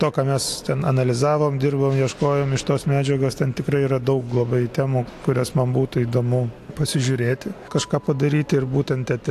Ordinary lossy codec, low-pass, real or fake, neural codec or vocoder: AAC, 64 kbps; 14.4 kHz; real; none